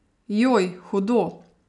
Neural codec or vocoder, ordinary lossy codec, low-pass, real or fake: none; none; 10.8 kHz; real